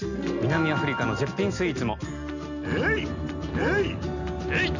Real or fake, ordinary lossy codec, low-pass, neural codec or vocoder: real; none; 7.2 kHz; none